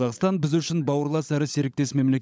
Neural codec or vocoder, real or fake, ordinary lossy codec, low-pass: codec, 16 kHz, 16 kbps, FunCodec, trained on LibriTTS, 50 frames a second; fake; none; none